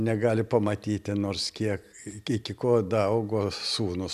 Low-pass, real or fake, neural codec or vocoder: 14.4 kHz; real; none